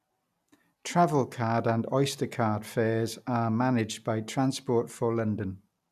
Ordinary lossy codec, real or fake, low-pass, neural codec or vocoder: none; real; 14.4 kHz; none